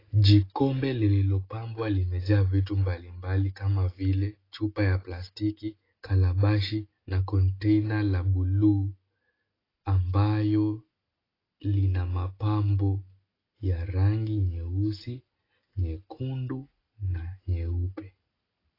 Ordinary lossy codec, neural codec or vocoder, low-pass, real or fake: AAC, 24 kbps; none; 5.4 kHz; real